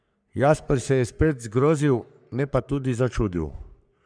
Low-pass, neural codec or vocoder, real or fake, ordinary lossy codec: 9.9 kHz; codec, 44.1 kHz, 3.4 kbps, Pupu-Codec; fake; none